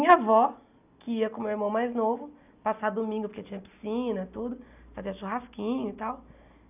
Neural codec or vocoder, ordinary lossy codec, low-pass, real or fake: none; none; 3.6 kHz; real